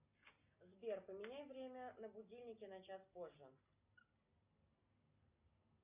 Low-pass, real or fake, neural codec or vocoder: 3.6 kHz; real; none